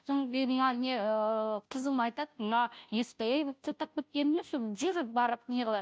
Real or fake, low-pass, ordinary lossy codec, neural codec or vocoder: fake; none; none; codec, 16 kHz, 0.5 kbps, FunCodec, trained on Chinese and English, 25 frames a second